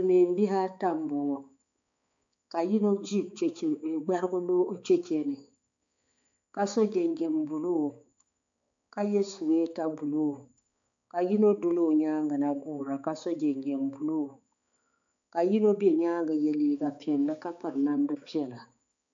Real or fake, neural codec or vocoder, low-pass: fake; codec, 16 kHz, 4 kbps, X-Codec, HuBERT features, trained on balanced general audio; 7.2 kHz